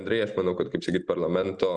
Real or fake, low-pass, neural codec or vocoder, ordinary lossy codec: real; 9.9 kHz; none; Opus, 64 kbps